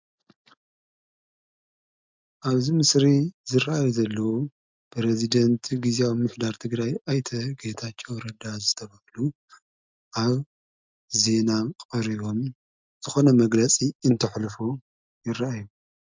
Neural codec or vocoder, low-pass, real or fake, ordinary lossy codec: none; 7.2 kHz; real; MP3, 64 kbps